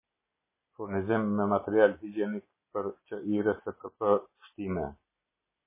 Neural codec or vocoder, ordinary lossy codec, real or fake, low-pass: none; MP3, 16 kbps; real; 3.6 kHz